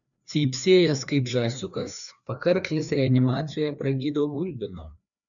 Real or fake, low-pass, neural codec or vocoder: fake; 7.2 kHz; codec, 16 kHz, 2 kbps, FreqCodec, larger model